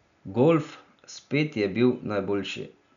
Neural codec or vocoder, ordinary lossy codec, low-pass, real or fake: none; none; 7.2 kHz; real